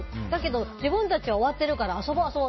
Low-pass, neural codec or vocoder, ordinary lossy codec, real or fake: 7.2 kHz; none; MP3, 24 kbps; real